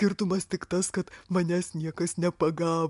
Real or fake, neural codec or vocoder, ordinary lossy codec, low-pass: real; none; MP3, 64 kbps; 10.8 kHz